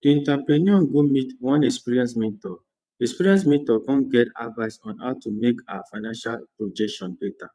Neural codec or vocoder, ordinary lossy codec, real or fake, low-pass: vocoder, 22.05 kHz, 80 mel bands, WaveNeXt; none; fake; none